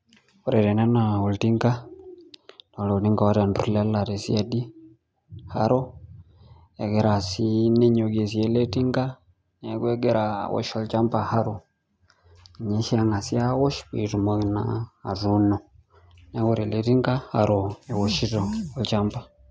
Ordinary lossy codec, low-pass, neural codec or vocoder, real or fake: none; none; none; real